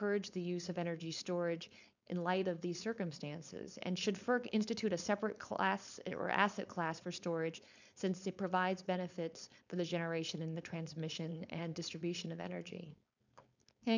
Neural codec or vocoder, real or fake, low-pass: codec, 16 kHz, 4.8 kbps, FACodec; fake; 7.2 kHz